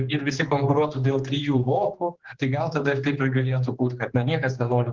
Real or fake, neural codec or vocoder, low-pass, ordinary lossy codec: fake; codec, 16 kHz, 2 kbps, X-Codec, HuBERT features, trained on general audio; 7.2 kHz; Opus, 16 kbps